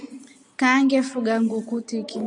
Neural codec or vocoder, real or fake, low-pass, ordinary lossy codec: none; real; 10.8 kHz; AAC, 64 kbps